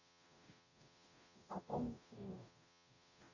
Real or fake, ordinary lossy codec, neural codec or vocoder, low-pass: fake; none; codec, 44.1 kHz, 0.9 kbps, DAC; 7.2 kHz